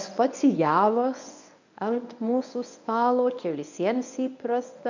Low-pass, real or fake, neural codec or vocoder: 7.2 kHz; fake; codec, 24 kHz, 0.9 kbps, WavTokenizer, medium speech release version 1